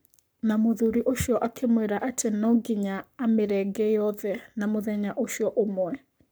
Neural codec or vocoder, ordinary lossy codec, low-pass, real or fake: codec, 44.1 kHz, 7.8 kbps, Pupu-Codec; none; none; fake